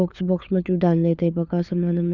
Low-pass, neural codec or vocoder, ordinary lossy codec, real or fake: 7.2 kHz; codec, 16 kHz, 4 kbps, FunCodec, trained on LibriTTS, 50 frames a second; none; fake